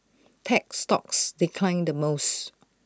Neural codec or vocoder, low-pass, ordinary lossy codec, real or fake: none; none; none; real